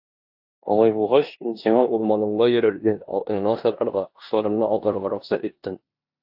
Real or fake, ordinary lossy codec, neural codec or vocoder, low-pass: fake; AAC, 48 kbps; codec, 16 kHz in and 24 kHz out, 0.9 kbps, LongCat-Audio-Codec, four codebook decoder; 5.4 kHz